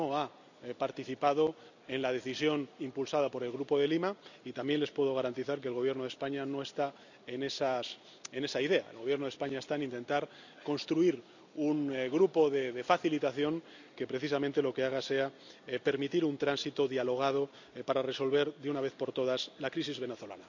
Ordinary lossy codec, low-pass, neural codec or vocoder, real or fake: none; 7.2 kHz; none; real